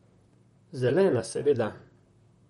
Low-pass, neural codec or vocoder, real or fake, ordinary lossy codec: 19.8 kHz; vocoder, 44.1 kHz, 128 mel bands, Pupu-Vocoder; fake; MP3, 48 kbps